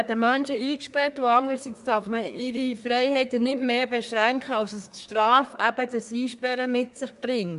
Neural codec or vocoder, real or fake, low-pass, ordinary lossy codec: codec, 24 kHz, 1 kbps, SNAC; fake; 10.8 kHz; AAC, 96 kbps